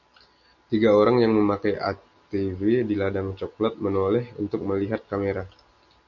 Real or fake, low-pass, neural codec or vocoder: real; 7.2 kHz; none